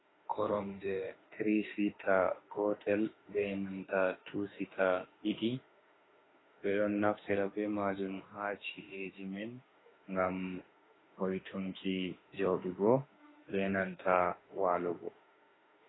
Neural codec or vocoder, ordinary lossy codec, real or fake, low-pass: autoencoder, 48 kHz, 32 numbers a frame, DAC-VAE, trained on Japanese speech; AAC, 16 kbps; fake; 7.2 kHz